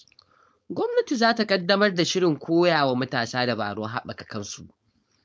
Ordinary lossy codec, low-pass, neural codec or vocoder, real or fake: none; none; codec, 16 kHz, 4.8 kbps, FACodec; fake